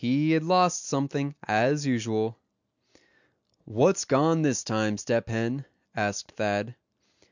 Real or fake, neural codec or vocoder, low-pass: real; none; 7.2 kHz